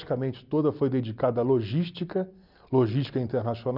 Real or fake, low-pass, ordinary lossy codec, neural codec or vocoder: real; 5.4 kHz; none; none